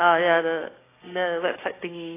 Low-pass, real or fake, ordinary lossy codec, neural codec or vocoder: 3.6 kHz; fake; AAC, 16 kbps; codec, 16 kHz, 6 kbps, DAC